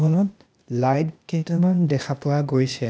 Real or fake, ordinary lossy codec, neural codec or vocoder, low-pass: fake; none; codec, 16 kHz, 0.8 kbps, ZipCodec; none